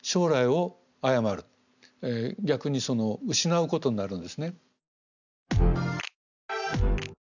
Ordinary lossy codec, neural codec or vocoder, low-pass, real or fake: none; none; 7.2 kHz; real